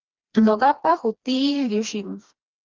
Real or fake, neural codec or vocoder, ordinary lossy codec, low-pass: fake; codec, 16 kHz, 2 kbps, FreqCodec, smaller model; Opus, 24 kbps; 7.2 kHz